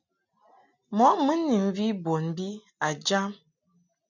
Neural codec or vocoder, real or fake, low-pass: none; real; 7.2 kHz